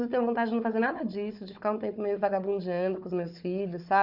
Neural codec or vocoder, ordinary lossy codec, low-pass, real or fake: codec, 16 kHz, 4 kbps, FunCodec, trained on Chinese and English, 50 frames a second; none; 5.4 kHz; fake